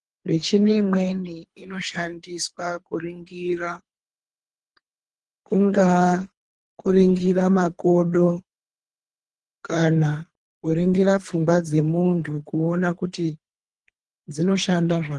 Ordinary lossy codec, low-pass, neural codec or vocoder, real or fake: Opus, 24 kbps; 10.8 kHz; codec, 24 kHz, 3 kbps, HILCodec; fake